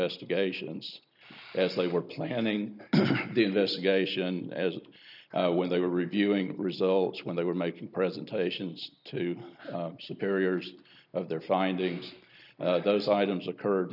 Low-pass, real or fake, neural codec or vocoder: 5.4 kHz; real; none